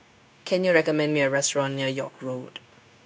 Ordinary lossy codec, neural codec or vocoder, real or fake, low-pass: none; codec, 16 kHz, 0.9 kbps, LongCat-Audio-Codec; fake; none